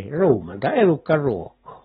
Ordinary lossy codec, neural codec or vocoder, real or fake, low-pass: AAC, 16 kbps; none; real; 19.8 kHz